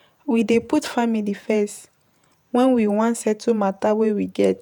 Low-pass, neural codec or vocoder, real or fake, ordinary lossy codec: none; vocoder, 48 kHz, 128 mel bands, Vocos; fake; none